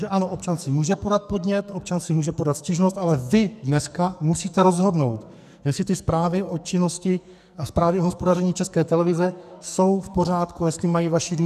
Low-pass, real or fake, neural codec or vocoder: 14.4 kHz; fake; codec, 44.1 kHz, 2.6 kbps, SNAC